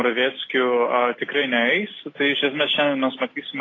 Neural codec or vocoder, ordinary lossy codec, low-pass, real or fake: none; AAC, 32 kbps; 7.2 kHz; real